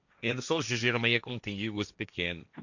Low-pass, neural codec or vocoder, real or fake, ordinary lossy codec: 7.2 kHz; codec, 16 kHz, 1.1 kbps, Voila-Tokenizer; fake; none